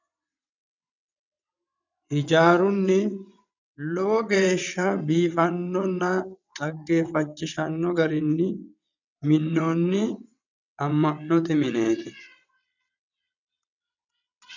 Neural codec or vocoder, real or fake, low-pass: vocoder, 22.05 kHz, 80 mel bands, WaveNeXt; fake; 7.2 kHz